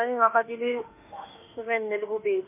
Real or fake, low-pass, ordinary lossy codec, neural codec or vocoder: fake; 3.6 kHz; MP3, 24 kbps; autoencoder, 48 kHz, 32 numbers a frame, DAC-VAE, trained on Japanese speech